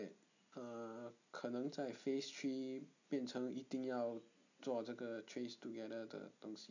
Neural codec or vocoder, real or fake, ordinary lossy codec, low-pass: none; real; none; 7.2 kHz